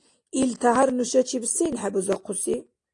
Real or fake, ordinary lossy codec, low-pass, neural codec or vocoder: real; AAC, 48 kbps; 10.8 kHz; none